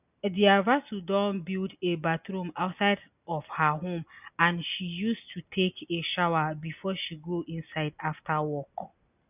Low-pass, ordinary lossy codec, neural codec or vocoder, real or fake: 3.6 kHz; none; none; real